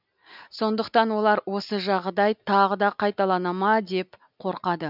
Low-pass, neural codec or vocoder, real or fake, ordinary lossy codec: 5.4 kHz; none; real; none